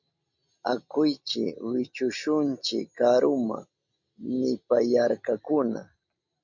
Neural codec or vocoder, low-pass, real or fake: none; 7.2 kHz; real